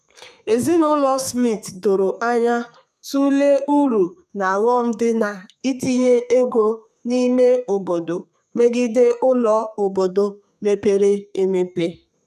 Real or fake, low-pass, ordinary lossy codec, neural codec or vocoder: fake; 14.4 kHz; none; codec, 32 kHz, 1.9 kbps, SNAC